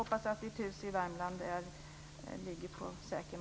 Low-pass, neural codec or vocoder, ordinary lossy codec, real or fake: none; none; none; real